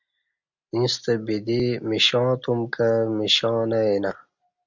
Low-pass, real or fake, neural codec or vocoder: 7.2 kHz; real; none